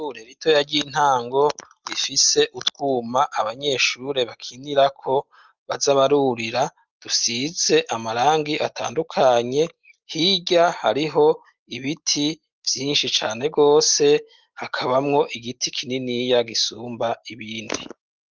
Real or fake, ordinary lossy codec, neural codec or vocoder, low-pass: real; Opus, 24 kbps; none; 7.2 kHz